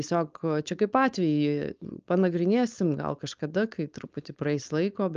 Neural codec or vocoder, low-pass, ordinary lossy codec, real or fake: codec, 16 kHz, 4.8 kbps, FACodec; 7.2 kHz; Opus, 24 kbps; fake